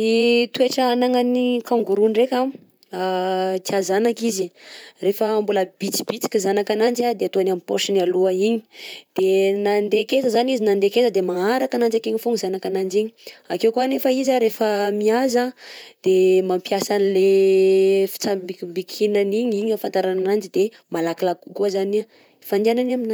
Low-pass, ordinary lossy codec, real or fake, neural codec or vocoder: none; none; fake; vocoder, 44.1 kHz, 128 mel bands, Pupu-Vocoder